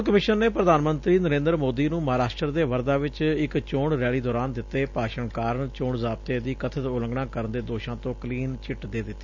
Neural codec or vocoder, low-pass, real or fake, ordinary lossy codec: none; 7.2 kHz; real; none